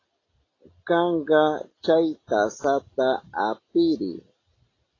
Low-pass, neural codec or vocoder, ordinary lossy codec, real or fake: 7.2 kHz; none; AAC, 32 kbps; real